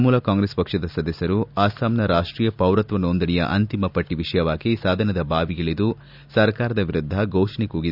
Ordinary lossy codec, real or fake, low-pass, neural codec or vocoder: none; real; 5.4 kHz; none